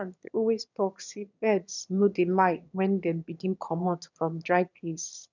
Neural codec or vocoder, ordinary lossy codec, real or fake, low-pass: autoencoder, 22.05 kHz, a latent of 192 numbers a frame, VITS, trained on one speaker; none; fake; 7.2 kHz